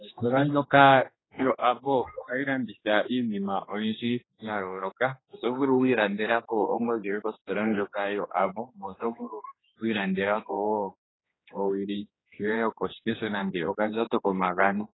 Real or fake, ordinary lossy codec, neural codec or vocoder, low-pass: fake; AAC, 16 kbps; codec, 16 kHz, 2 kbps, X-Codec, HuBERT features, trained on general audio; 7.2 kHz